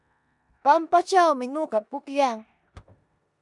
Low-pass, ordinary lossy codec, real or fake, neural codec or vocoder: 10.8 kHz; MP3, 96 kbps; fake; codec, 16 kHz in and 24 kHz out, 0.9 kbps, LongCat-Audio-Codec, four codebook decoder